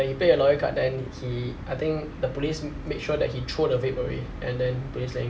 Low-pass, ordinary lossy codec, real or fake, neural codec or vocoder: none; none; real; none